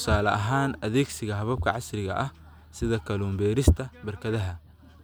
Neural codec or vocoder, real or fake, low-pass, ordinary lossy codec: none; real; none; none